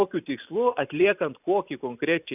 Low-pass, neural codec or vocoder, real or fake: 3.6 kHz; vocoder, 44.1 kHz, 128 mel bands every 256 samples, BigVGAN v2; fake